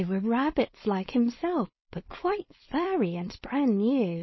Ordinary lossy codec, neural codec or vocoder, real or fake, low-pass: MP3, 24 kbps; codec, 16 kHz, 4.8 kbps, FACodec; fake; 7.2 kHz